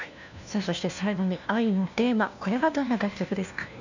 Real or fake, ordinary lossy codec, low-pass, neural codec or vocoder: fake; none; 7.2 kHz; codec, 16 kHz, 1 kbps, FunCodec, trained on LibriTTS, 50 frames a second